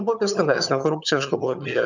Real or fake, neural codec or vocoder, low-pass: fake; vocoder, 22.05 kHz, 80 mel bands, HiFi-GAN; 7.2 kHz